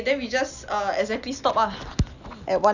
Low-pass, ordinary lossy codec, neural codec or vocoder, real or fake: 7.2 kHz; none; none; real